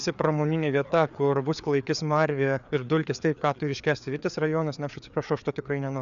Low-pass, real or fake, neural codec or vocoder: 7.2 kHz; fake; codec, 16 kHz, 4 kbps, FreqCodec, larger model